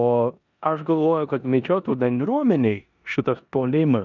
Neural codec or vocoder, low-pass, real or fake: codec, 16 kHz in and 24 kHz out, 0.9 kbps, LongCat-Audio-Codec, four codebook decoder; 7.2 kHz; fake